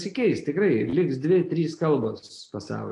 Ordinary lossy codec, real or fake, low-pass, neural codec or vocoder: AAC, 48 kbps; real; 10.8 kHz; none